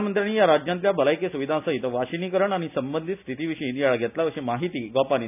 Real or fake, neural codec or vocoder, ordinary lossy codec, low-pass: real; none; none; 3.6 kHz